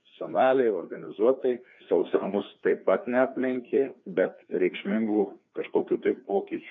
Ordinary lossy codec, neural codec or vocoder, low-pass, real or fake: AAC, 64 kbps; codec, 16 kHz, 2 kbps, FreqCodec, larger model; 7.2 kHz; fake